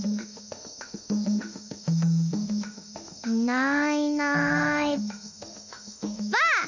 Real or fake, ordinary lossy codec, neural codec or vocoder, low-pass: fake; none; autoencoder, 48 kHz, 32 numbers a frame, DAC-VAE, trained on Japanese speech; 7.2 kHz